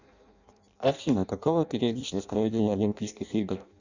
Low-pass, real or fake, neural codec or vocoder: 7.2 kHz; fake; codec, 16 kHz in and 24 kHz out, 0.6 kbps, FireRedTTS-2 codec